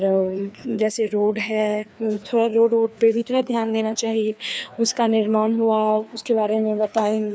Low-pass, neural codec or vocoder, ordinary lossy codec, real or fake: none; codec, 16 kHz, 2 kbps, FreqCodec, larger model; none; fake